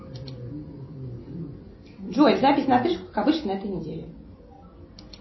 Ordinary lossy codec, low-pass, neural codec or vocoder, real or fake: MP3, 24 kbps; 7.2 kHz; none; real